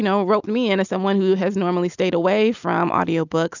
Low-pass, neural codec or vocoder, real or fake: 7.2 kHz; none; real